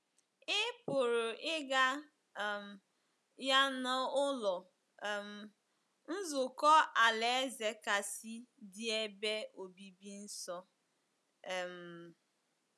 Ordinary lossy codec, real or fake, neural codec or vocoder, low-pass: none; real; none; none